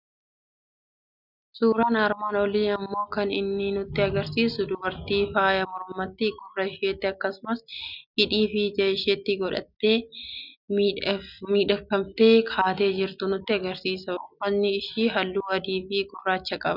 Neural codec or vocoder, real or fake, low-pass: none; real; 5.4 kHz